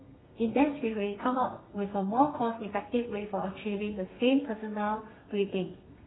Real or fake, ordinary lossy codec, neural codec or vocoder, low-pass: fake; AAC, 16 kbps; codec, 32 kHz, 1.9 kbps, SNAC; 7.2 kHz